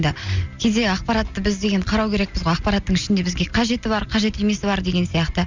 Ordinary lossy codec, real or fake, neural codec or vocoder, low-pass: Opus, 64 kbps; real; none; 7.2 kHz